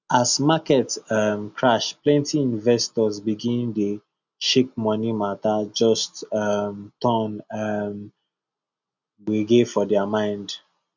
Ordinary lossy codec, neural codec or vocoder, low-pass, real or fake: none; none; 7.2 kHz; real